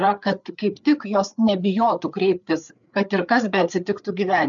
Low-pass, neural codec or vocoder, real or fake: 7.2 kHz; codec, 16 kHz, 4 kbps, FreqCodec, larger model; fake